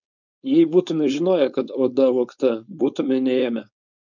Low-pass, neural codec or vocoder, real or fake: 7.2 kHz; codec, 16 kHz, 4.8 kbps, FACodec; fake